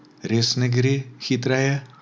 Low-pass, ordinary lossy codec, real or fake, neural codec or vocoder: none; none; real; none